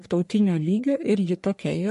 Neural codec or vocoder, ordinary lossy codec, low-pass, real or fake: codec, 44.1 kHz, 3.4 kbps, Pupu-Codec; MP3, 48 kbps; 14.4 kHz; fake